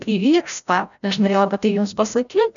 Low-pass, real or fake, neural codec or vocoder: 7.2 kHz; fake; codec, 16 kHz, 0.5 kbps, FreqCodec, larger model